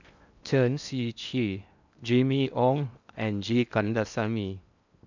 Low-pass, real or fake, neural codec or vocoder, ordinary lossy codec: 7.2 kHz; fake; codec, 16 kHz in and 24 kHz out, 0.8 kbps, FocalCodec, streaming, 65536 codes; none